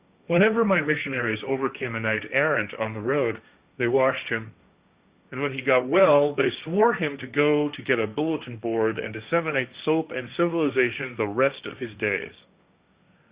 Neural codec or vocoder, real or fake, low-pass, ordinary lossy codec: codec, 16 kHz, 1.1 kbps, Voila-Tokenizer; fake; 3.6 kHz; Opus, 64 kbps